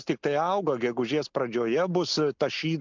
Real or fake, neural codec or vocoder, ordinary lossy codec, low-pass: real; none; MP3, 64 kbps; 7.2 kHz